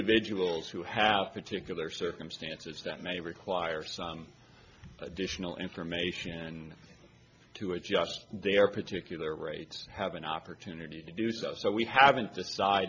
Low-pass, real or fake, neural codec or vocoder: 7.2 kHz; real; none